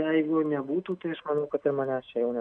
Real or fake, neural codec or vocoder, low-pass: real; none; 9.9 kHz